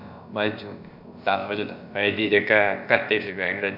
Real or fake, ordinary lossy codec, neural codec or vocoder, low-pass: fake; none; codec, 16 kHz, about 1 kbps, DyCAST, with the encoder's durations; 5.4 kHz